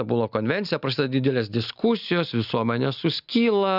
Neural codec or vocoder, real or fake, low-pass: none; real; 5.4 kHz